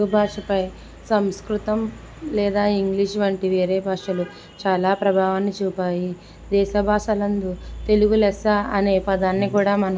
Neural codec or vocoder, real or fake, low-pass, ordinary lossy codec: none; real; none; none